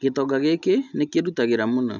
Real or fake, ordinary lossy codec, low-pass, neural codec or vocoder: real; none; 7.2 kHz; none